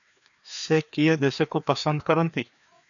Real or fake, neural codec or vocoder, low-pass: fake; codec, 16 kHz, 2 kbps, FreqCodec, larger model; 7.2 kHz